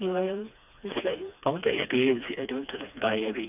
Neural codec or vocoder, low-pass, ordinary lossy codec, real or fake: codec, 16 kHz, 2 kbps, FreqCodec, smaller model; 3.6 kHz; none; fake